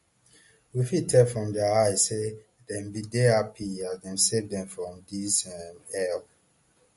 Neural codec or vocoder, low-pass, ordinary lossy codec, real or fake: none; 14.4 kHz; MP3, 48 kbps; real